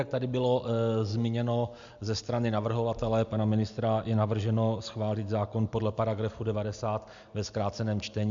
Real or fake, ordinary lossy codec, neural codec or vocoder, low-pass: real; MP3, 64 kbps; none; 7.2 kHz